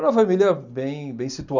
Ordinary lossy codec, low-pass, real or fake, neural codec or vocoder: none; 7.2 kHz; real; none